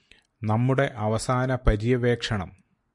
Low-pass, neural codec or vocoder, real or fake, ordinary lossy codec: 10.8 kHz; none; real; MP3, 64 kbps